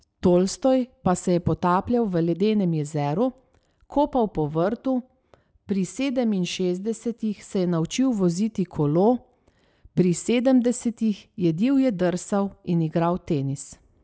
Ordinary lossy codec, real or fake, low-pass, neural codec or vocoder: none; real; none; none